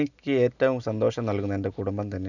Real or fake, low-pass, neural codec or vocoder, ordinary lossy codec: real; 7.2 kHz; none; none